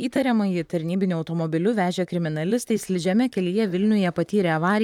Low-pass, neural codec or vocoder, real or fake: 19.8 kHz; vocoder, 44.1 kHz, 128 mel bands, Pupu-Vocoder; fake